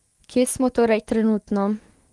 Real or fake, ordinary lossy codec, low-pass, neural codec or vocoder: fake; Opus, 24 kbps; 10.8 kHz; autoencoder, 48 kHz, 128 numbers a frame, DAC-VAE, trained on Japanese speech